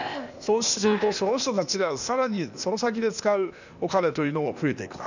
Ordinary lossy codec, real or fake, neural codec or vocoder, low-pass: none; fake; codec, 16 kHz, 0.8 kbps, ZipCodec; 7.2 kHz